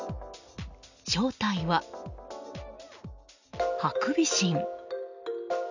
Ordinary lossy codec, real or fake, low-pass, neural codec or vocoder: none; real; 7.2 kHz; none